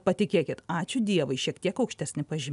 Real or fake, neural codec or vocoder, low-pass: real; none; 10.8 kHz